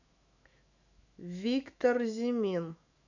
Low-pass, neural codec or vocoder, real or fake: 7.2 kHz; autoencoder, 48 kHz, 128 numbers a frame, DAC-VAE, trained on Japanese speech; fake